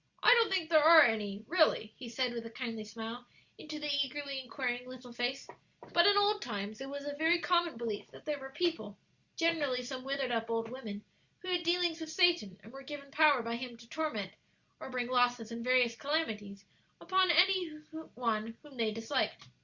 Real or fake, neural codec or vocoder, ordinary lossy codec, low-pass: real; none; Opus, 64 kbps; 7.2 kHz